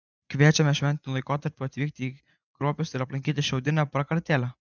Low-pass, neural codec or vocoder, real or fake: 7.2 kHz; vocoder, 24 kHz, 100 mel bands, Vocos; fake